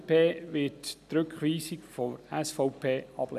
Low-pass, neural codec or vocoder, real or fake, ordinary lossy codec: 14.4 kHz; none; real; none